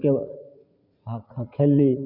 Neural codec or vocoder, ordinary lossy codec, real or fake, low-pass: vocoder, 44.1 kHz, 80 mel bands, Vocos; none; fake; 5.4 kHz